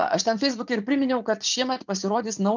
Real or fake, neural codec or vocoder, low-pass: fake; vocoder, 44.1 kHz, 80 mel bands, Vocos; 7.2 kHz